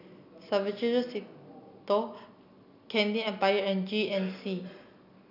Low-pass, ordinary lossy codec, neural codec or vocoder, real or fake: 5.4 kHz; none; none; real